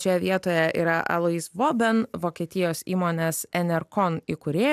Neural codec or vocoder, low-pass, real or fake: none; 14.4 kHz; real